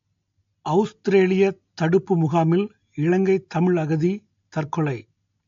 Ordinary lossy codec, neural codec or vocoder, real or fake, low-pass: AAC, 48 kbps; none; real; 7.2 kHz